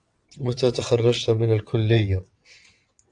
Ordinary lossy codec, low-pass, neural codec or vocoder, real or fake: AAC, 64 kbps; 9.9 kHz; vocoder, 22.05 kHz, 80 mel bands, WaveNeXt; fake